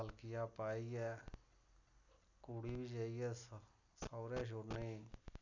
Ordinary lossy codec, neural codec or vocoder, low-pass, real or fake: none; none; 7.2 kHz; real